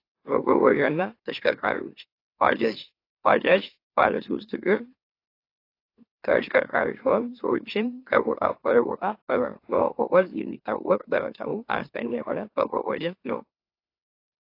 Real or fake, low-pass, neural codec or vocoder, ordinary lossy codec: fake; 5.4 kHz; autoencoder, 44.1 kHz, a latent of 192 numbers a frame, MeloTTS; AAC, 32 kbps